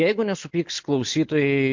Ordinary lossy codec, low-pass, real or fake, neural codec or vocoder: MP3, 48 kbps; 7.2 kHz; real; none